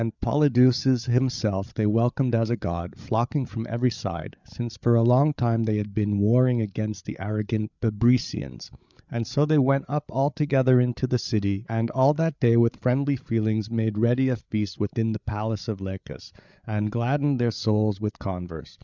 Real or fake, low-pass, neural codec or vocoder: fake; 7.2 kHz; codec, 16 kHz, 8 kbps, FreqCodec, larger model